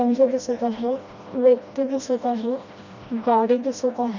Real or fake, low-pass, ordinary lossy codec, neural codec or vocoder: fake; 7.2 kHz; none; codec, 16 kHz, 1 kbps, FreqCodec, smaller model